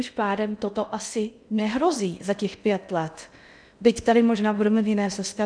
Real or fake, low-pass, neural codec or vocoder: fake; 9.9 kHz; codec, 16 kHz in and 24 kHz out, 0.6 kbps, FocalCodec, streaming, 2048 codes